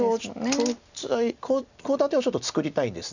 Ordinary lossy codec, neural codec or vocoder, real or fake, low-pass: none; none; real; 7.2 kHz